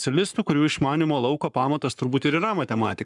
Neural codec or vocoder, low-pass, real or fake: codec, 44.1 kHz, 7.8 kbps, Pupu-Codec; 10.8 kHz; fake